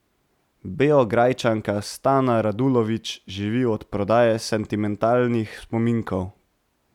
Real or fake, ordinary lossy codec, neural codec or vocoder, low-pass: real; none; none; 19.8 kHz